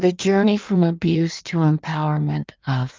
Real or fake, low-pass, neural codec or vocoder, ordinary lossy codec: fake; 7.2 kHz; codec, 16 kHz in and 24 kHz out, 1.1 kbps, FireRedTTS-2 codec; Opus, 32 kbps